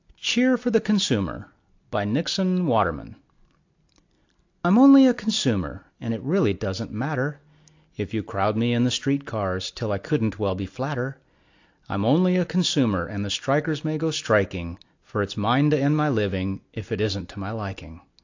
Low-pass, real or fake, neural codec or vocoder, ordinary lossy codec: 7.2 kHz; real; none; AAC, 48 kbps